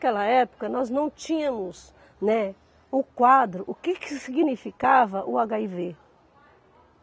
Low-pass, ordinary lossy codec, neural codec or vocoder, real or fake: none; none; none; real